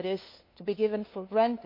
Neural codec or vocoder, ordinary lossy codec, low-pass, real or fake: codec, 16 kHz, 2 kbps, FunCodec, trained on LibriTTS, 25 frames a second; MP3, 32 kbps; 5.4 kHz; fake